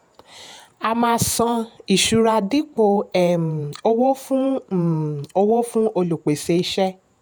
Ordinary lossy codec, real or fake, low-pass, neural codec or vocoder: none; fake; none; vocoder, 48 kHz, 128 mel bands, Vocos